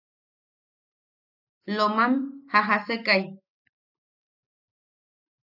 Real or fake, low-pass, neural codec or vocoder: real; 5.4 kHz; none